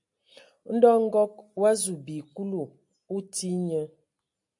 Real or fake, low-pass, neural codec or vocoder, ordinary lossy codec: real; 10.8 kHz; none; AAC, 64 kbps